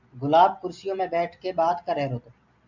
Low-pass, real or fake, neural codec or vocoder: 7.2 kHz; real; none